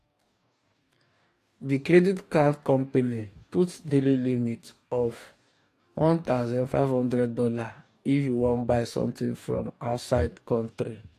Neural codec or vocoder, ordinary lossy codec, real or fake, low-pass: codec, 44.1 kHz, 2.6 kbps, DAC; AAC, 64 kbps; fake; 14.4 kHz